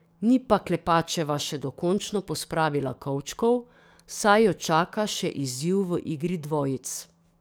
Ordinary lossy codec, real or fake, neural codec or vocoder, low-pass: none; fake; codec, 44.1 kHz, 7.8 kbps, DAC; none